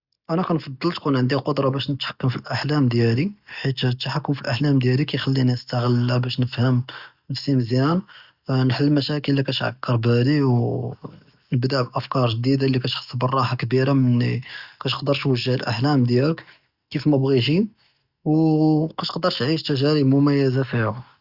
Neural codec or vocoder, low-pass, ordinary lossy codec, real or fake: none; 5.4 kHz; Opus, 64 kbps; real